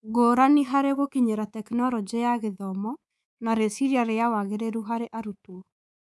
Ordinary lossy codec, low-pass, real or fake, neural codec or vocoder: none; none; fake; codec, 24 kHz, 3.1 kbps, DualCodec